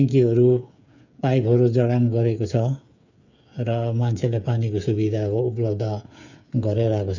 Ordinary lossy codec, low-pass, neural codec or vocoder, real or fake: none; 7.2 kHz; codec, 16 kHz, 8 kbps, FreqCodec, smaller model; fake